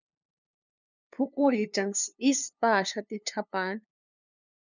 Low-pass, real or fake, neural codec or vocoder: 7.2 kHz; fake; codec, 16 kHz, 2 kbps, FunCodec, trained on LibriTTS, 25 frames a second